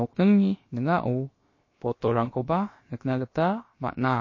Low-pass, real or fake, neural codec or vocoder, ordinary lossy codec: 7.2 kHz; fake; codec, 16 kHz, about 1 kbps, DyCAST, with the encoder's durations; MP3, 32 kbps